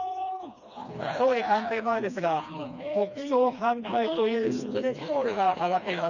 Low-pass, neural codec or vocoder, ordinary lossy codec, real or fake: 7.2 kHz; codec, 16 kHz, 2 kbps, FreqCodec, smaller model; none; fake